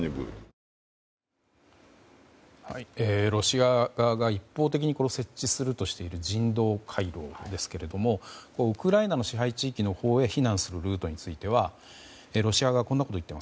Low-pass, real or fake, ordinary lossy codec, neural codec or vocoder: none; real; none; none